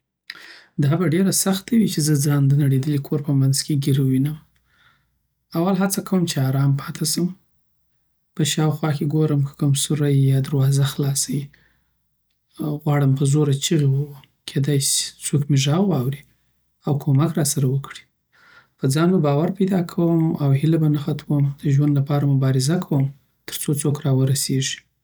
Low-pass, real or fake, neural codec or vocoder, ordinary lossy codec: none; real; none; none